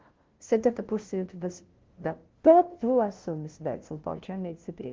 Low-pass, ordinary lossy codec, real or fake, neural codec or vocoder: 7.2 kHz; Opus, 16 kbps; fake; codec, 16 kHz, 0.5 kbps, FunCodec, trained on LibriTTS, 25 frames a second